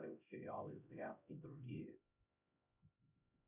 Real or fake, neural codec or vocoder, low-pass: fake; codec, 16 kHz, 0.5 kbps, X-Codec, HuBERT features, trained on LibriSpeech; 3.6 kHz